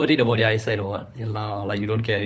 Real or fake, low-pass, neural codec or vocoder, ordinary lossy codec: fake; none; codec, 16 kHz, 4 kbps, FunCodec, trained on LibriTTS, 50 frames a second; none